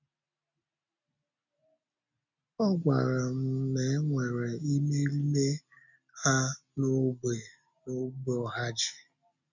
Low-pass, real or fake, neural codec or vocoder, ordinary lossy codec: 7.2 kHz; real; none; none